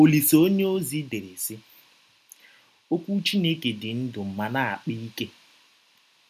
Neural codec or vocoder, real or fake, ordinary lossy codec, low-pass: none; real; none; 14.4 kHz